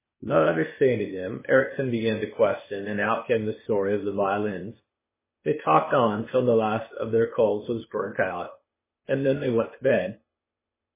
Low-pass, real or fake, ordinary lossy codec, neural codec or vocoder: 3.6 kHz; fake; MP3, 16 kbps; codec, 16 kHz, 0.8 kbps, ZipCodec